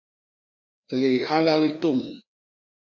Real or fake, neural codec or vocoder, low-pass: fake; codec, 16 kHz, 2 kbps, FreqCodec, larger model; 7.2 kHz